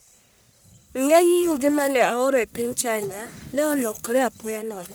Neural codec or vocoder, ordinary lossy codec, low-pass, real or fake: codec, 44.1 kHz, 1.7 kbps, Pupu-Codec; none; none; fake